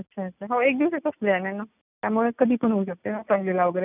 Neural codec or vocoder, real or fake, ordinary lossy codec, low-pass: none; real; none; 3.6 kHz